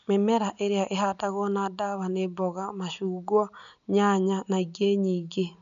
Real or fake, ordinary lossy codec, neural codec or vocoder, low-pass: real; none; none; 7.2 kHz